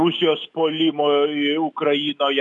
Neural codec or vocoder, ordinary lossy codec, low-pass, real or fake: autoencoder, 48 kHz, 128 numbers a frame, DAC-VAE, trained on Japanese speech; MP3, 64 kbps; 10.8 kHz; fake